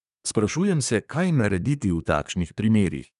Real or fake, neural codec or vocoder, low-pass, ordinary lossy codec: fake; codec, 24 kHz, 1 kbps, SNAC; 10.8 kHz; none